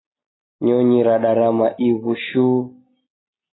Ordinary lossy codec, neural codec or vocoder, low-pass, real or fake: AAC, 16 kbps; none; 7.2 kHz; real